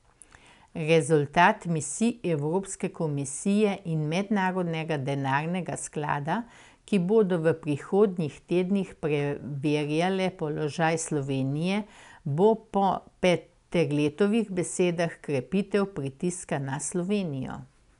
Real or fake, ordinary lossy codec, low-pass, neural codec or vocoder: real; none; 10.8 kHz; none